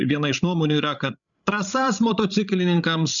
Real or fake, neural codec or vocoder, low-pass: fake; codec, 16 kHz, 16 kbps, FreqCodec, larger model; 7.2 kHz